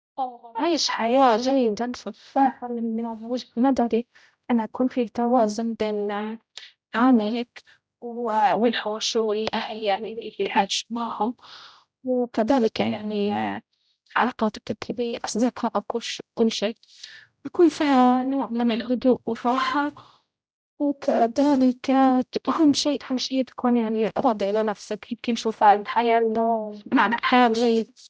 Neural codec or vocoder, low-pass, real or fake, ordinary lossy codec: codec, 16 kHz, 0.5 kbps, X-Codec, HuBERT features, trained on general audio; none; fake; none